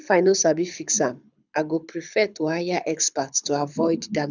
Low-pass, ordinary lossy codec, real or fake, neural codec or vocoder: 7.2 kHz; none; fake; codec, 24 kHz, 6 kbps, HILCodec